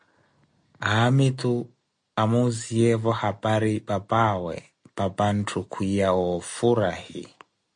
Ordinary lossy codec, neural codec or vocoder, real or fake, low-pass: MP3, 48 kbps; none; real; 9.9 kHz